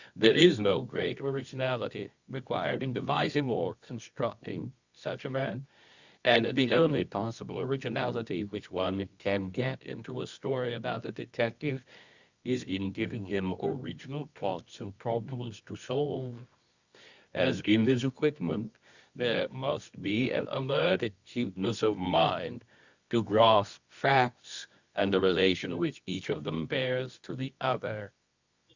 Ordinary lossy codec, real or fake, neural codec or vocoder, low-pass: Opus, 64 kbps; fake; codec, 24 kHz, 0.9 kbps, WavTokenizer, medium music audio release; 7.2 kHz